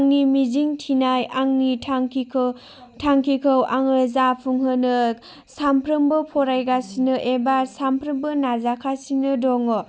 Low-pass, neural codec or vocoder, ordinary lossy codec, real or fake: none; none; none; real